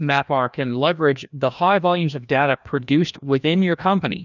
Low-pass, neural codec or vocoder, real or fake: 7.2 kHz; codec, 16 kHz, 1 kbps, FreqCodec, larger model; fake